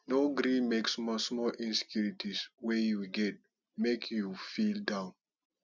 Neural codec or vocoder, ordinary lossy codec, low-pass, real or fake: none; none; 7.2 kHz; real